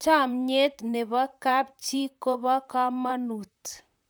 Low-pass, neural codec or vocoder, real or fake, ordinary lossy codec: none; vocoder, 44.1 kHz, 128 mel bands, Pupu-Vocoder; fake; none